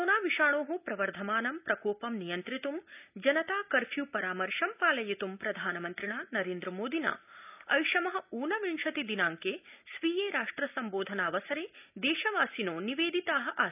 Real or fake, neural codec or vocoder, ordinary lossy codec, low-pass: real; none; none; 3.6 kHz